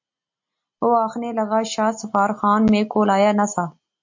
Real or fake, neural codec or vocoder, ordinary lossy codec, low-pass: real; none; MP3, 48 kbps; 7.2 kHz